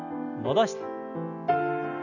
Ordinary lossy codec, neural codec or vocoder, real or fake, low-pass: none; none; real; 7.2 kHz